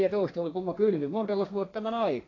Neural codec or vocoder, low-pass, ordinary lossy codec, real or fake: codec, 32 kHz, 1.9 kbps, SNAC; 7.2 kHz; AAC, 32 kbps; fake